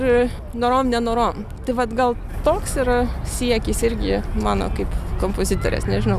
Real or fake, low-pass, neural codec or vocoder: real; 14.4 kHz; none